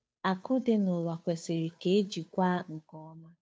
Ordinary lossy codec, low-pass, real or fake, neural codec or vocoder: none; none; fake; codec, 16 kHz, 2 kbps, FunCodec, trained on Chinese and English, 25 frames a second